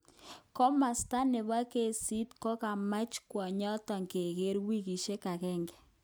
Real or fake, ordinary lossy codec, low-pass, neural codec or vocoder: real; none; none; none